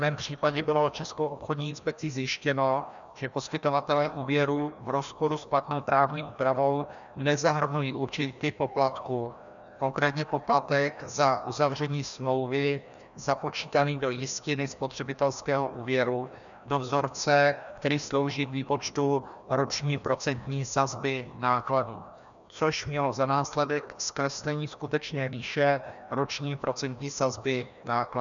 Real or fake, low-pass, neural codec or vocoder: fake; 7.2 kHz; codec, 16 kHz, 1 kbps, FreqCodec, larger model